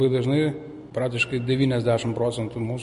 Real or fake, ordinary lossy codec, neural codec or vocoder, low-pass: real; MP3, 48 kbps; none; 10.8 kHz